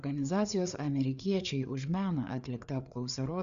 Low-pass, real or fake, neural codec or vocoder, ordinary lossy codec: 7.2 kHz; fake; codec, 16 kHz, 4 kbps, FreqCodec, larger model; Opus, 64 kbps